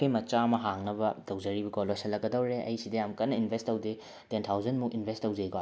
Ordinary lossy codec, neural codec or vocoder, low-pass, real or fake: none; none; none; real